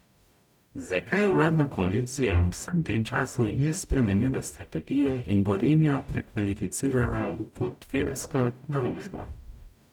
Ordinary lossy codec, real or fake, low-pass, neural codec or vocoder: none; fake; 19.8 kHz; codec, 44.1 kHz, 0.9 kbps, DAC